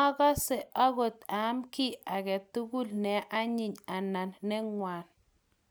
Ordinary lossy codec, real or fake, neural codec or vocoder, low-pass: none; real; none; none